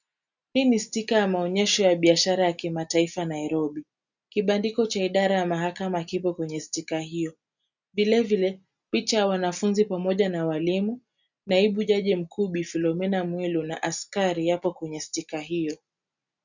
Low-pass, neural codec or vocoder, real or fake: 7.2 kHz; none; real